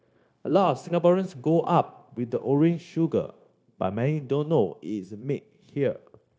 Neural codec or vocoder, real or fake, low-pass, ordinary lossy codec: codec, 16 kHz, 0.9 kbps, LongCat-Audio-Codec; fake; none; none